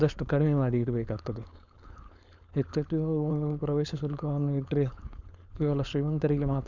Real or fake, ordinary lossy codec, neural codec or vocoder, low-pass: fake; none; codec, 16 kHz, 4.8 kbps, FACodec; 7.2 kHz